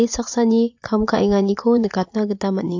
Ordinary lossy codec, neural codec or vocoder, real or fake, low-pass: AAC, 48 kbps; vocoder, 44.1 kHz, 80 mel bands, Vocos; fake; 7.2 kHz